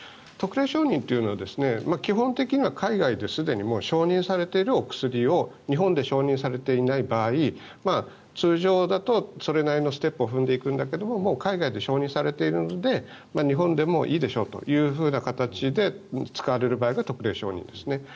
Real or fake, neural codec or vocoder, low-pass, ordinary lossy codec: real; none; none; none